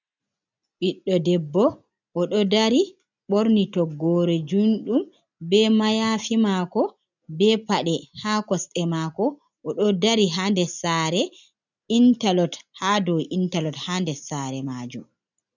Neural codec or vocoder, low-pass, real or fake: none; 7.2 kHz; real